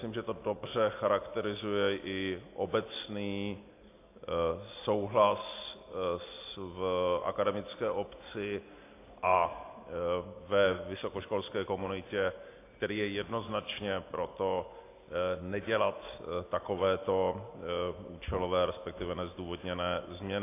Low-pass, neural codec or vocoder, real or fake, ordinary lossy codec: 3.6 kHz; none; real; AAC, 24 kbps